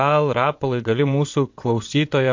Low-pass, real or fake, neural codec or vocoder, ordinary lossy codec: 7.2 kHz; fake; vocoder, 22.05 kHz, 80 mel bands, WaveNeXt; MP3, 48 kbps